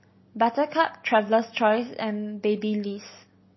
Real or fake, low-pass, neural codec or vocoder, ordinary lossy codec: real; 7.2 kHz; none; MP3, 24 kbps